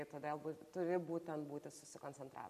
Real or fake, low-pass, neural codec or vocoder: real; 14.4 kHz; none